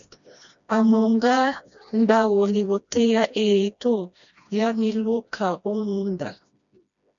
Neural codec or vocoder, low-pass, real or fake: codec, 16 kHz, 1 kbps, FreqCodec, smaller model; 7.2 kHz; fake